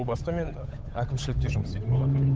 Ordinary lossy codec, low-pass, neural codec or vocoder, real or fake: none; none; codec, 16 kHz, 8 kbps, FunCodec, trained on Chinese and English, 25 frames a second; fake